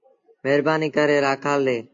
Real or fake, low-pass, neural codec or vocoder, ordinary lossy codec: real; 7.2 kHz; none; AAC, 48 kbps